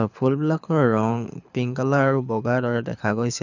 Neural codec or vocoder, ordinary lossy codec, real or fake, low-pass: codec, 24 kHz, 6 kbps, HILCodec; none; fake; 7.2 kHz